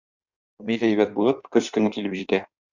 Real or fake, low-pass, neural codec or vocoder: fake; 7.2 kHz; codec, 16 kHz in and 24 kHz out, 1.1 kbps, FireRedTTS-2 codec